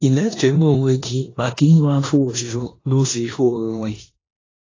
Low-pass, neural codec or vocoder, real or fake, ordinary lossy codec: 7.2 kHz; codec, 16 kHz in and 24 kHz out, 0.9 kbps, LongCat-Audio-Codec, four codebook decoder; fake; AAC, 32 kbps